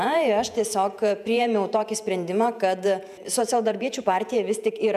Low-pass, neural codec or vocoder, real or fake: 14.4 kHz; vocoder, 48 kHz, 128 mel bands, Vocos; fake